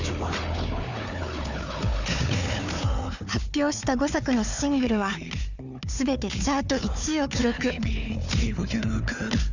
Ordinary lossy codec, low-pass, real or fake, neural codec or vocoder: none; 7.2 kHz; fake; codec, 16 kHz, 4 kbps, FunCodec, trained on Chinese and English, 50 frames a second